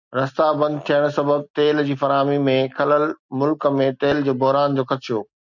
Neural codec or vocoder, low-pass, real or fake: none; 7.2 kHz; real